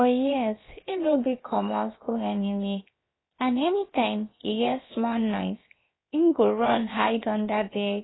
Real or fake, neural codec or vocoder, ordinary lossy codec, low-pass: fake; codec, 16 kHz, about 1 kbps, DyCAST, with the encoder's durations; AAC, 16 kbps; 7.2 kHz